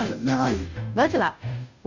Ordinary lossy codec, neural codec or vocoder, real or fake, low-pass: none; codec, 16 kHz, 0.5 kbps, FunCodec, trained on Chinese and English, 25 frames a second; fake; 7.2 kHz